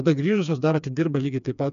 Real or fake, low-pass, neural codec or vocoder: fake; 7.2 kHz; codec, 16 kHz, 4 kbps, FreqCodec, smaller model